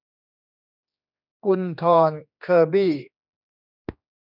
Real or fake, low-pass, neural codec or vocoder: fake; 5.4 kHz; codec, 16 kHz, 2 kbps, X-Codec, HuBERT features, trained on general audio